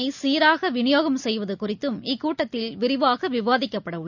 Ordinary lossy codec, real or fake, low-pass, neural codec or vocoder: none; real; 7.2 kHz; none